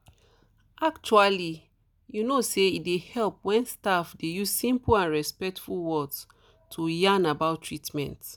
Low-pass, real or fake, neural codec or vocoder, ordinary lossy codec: none; real; none; none